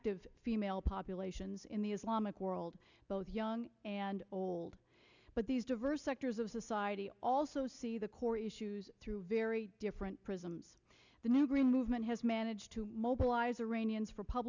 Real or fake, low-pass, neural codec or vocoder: real; 7.2 kHz; none